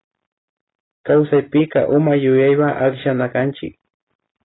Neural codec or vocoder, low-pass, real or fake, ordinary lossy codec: none; 7.2 kHz; real; AAC, 16 kbps